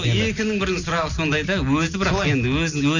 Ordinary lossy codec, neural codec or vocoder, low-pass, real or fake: none; none; 7.2 kHz; real